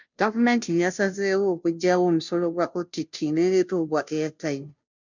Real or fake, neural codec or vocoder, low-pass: fake; codec, 16 kHz, 0.5 kbps, FunCodec, trained on Chinese and English, 25 frames a second; 7.2 kHz